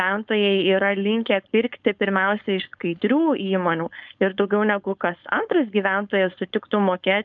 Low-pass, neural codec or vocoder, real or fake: 7.2 kHz; codec, 16 kHz, 4.8 kbps, FACodec; fake